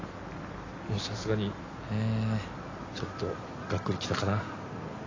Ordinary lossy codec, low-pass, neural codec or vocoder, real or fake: AAC, 32 kbps; 7.2 kHz; none; real